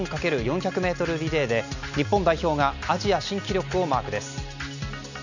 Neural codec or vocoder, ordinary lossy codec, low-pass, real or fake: none; none; 7.2 kHz; real